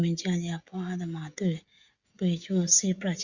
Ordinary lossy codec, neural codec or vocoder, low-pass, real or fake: Opus, 64 kbps; codec, 16 kHz, 16 kbps, FreqCodec, smaller model; 7.2 kHz; fake